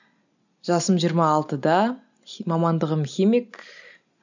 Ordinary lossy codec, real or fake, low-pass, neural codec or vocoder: none; real; 7.2 kHz; none